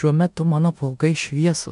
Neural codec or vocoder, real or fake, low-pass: codec, 16 kHz in and 24 kHz out, 0.9 kbps, LongCat-Audio-Codec, four codebook decoder; fake; 10.8 kHz